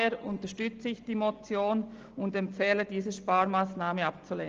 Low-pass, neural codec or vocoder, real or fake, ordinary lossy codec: 7.2 kHz; none; real; Opus, 24 kbps